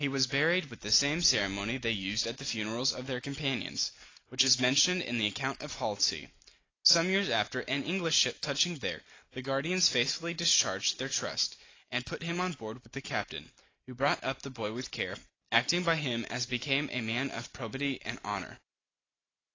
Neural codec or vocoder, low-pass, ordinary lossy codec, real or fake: none; 7.2 kHz; AAC, 32 kbps; real